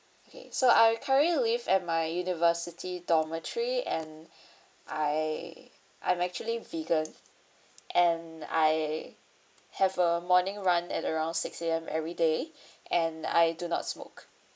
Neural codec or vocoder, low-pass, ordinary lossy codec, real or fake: none; none; none; real